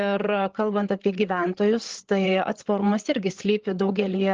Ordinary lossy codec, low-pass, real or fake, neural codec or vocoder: Opus, 16 kbps; 7.2 kHz; fake; codec, 16 kHz, 8 kbps, FreqCodec, larger model